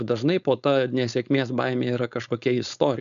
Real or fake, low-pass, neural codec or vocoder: fake; 7.2 kHz; codec, 16 kHz, 4.8 kbps, FACodec